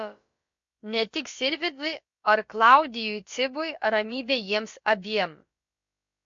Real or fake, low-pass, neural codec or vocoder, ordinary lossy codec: fake; 7.2 kHz; codec, 16 kHz, about 1 kbps, DyCAST, with the encoder's durations; MP3, 48 kbps